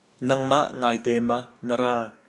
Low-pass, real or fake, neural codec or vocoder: 10.8 kHz; fake; codec, 44.1 kHz, 2.6 kbps, DAC